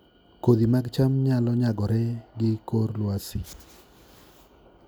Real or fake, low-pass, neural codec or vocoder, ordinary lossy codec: real; none; none; none